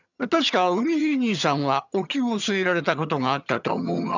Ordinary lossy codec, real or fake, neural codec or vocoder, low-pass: none; fake; vocoder, 22.05 kHz, 80 mel bands, HiFi-GAN; 7.2 kHz